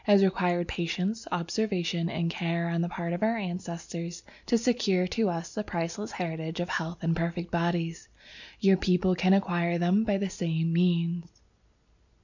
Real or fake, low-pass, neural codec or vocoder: real; 7.2 kHz; none